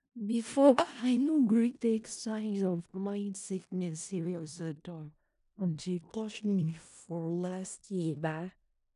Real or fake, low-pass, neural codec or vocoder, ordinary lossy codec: fake; 10.8 kHz; codec, 16 kHz in and 24 kHz out, 0.4 kbps, LongCat-Audio-Codec, four codebook decoder; none